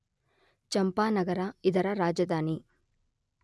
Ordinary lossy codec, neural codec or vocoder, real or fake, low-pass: none; none; real; none